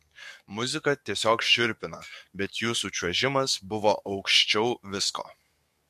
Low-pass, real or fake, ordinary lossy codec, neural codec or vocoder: 14.4 kHz; fake; MP3, 64 kbps; codec, 44.1 kHz, 7.8 kbps, DAC